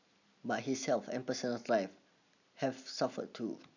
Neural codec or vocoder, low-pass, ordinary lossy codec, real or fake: none; 7.2 kHz; none; real